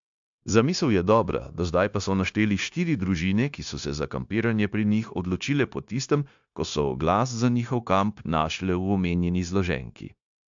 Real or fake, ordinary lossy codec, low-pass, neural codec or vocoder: fake; AAC, 64 kbps; 7.2 kHz; codec, 16 kHz, 0.9 kbps, LongCat-Audio-Codec